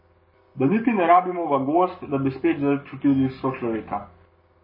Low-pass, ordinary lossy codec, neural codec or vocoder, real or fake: 5.4 kHz; MP3, 24 kbps; codec, 44.1 kHz, 7.8 kbps, Pupu-Codec; fake